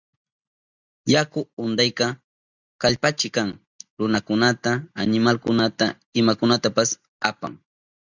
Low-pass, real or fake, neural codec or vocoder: 7.2 kHz; real; none